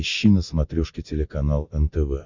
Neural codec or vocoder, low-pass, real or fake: none; 7.2 kHz; real